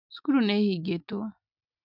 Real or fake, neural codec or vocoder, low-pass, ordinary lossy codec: real; none; 5.4 kHz; none